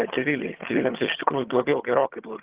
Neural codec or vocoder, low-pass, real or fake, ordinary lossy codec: vocoder, 22.05 kHz, 80 mel bands, HiFi-GAN; 3.6 kHz; fake; Opus, 16 kbps